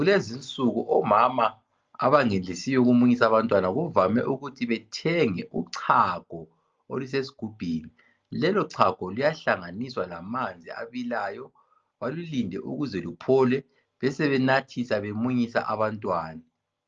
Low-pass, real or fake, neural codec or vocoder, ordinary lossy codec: 7.2 kHz; real; none; Opus, 24 kbps